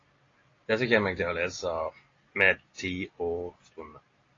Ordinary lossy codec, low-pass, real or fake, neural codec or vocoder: AAC, 32 kbps; 7.2 kHz; real; none